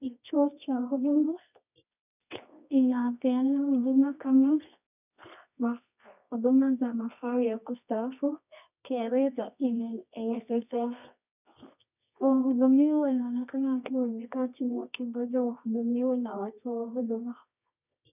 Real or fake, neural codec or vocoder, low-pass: fake; codec, 24 kHz, 0.9 kbps, WavTokenizer, medium music audio release; 3.6 kHz